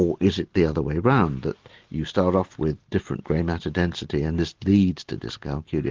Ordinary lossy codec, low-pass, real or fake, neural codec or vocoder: Opus, 32 kbps; 7.2 kHz; real; none